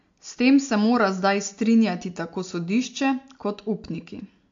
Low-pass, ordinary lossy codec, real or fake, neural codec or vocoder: 7.2 kHz; MP3, 48 kbps; real; none